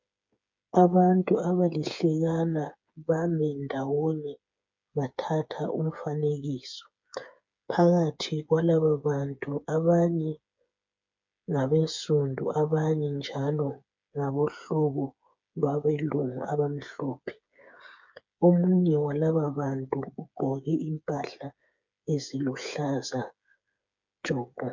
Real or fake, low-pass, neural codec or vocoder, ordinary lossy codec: fake; 7.2 kHz; codec, 16 kHz, 8 kbps, FreqCodec, smaller model; MP3, 64 kbps